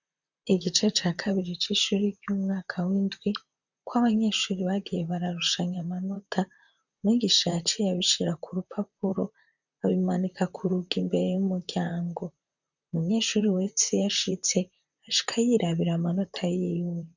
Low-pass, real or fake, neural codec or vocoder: 7.2 kHz; fake; vocoder, 44.1 kHz, 128 mel bands, Pupu-Vocoder